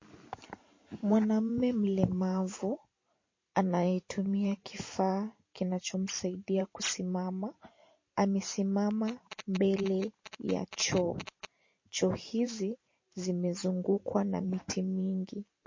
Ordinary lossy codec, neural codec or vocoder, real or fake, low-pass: MP3, 32 kbps; vocoder, 44.1 kHz, 128 mel bands every 512 samples, BigVGAN v2; fake; 7.2 kHz